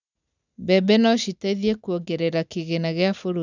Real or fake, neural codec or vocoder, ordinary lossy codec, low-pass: real; none; none; 7.2 kHz